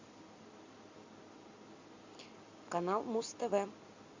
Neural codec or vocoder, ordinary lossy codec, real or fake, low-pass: none; MP3, 64 kbps; real; 7.2 kHz